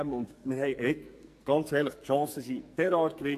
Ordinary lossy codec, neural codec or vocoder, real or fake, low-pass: AAC, 64 kbps; codec, 44.1 kHz, 2.6 kbps, SNAC; fake; 14.4 kHz